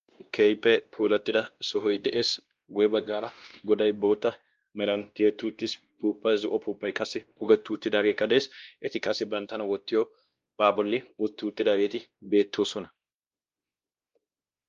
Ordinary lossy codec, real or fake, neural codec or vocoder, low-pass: Opus, 32 kbps; fake; codec, 16 kHz, 1 kbps, X-Codec, WavLM features, trained on Multilingual LibriSpeech; 7.2 kHz